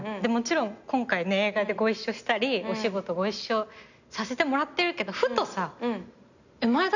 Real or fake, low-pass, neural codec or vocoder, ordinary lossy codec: real; 7.2 kHz; none; none